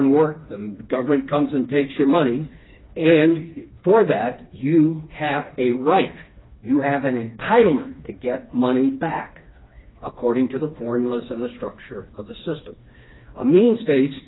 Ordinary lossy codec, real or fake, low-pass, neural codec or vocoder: AAC, 16 kbps; fake; 7.2 kHz; codec, 16 kHz, 2 kbps, FreqCodec, smaller model